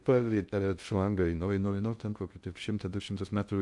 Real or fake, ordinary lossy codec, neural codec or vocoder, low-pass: fake; MP3, 64 kbps; codec, 16 kHz in and 24 kHz out, 0.6 kbps, FocalCodec, streaming, 2048 codes; 10.8 kHz